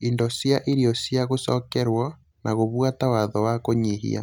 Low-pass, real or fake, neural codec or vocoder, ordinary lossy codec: 19.8 kHz; real; none; none